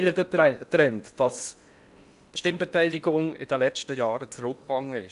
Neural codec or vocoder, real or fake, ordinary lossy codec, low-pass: codec, 16 kHz in and 24 kHz out, 0.8 kbps, FocalCodec, streaming, 65536 codes; fake; none; 10.8 kHz